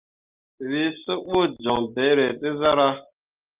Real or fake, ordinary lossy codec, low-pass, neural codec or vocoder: real; Opus, 24 kbps; 3.6 kHz; none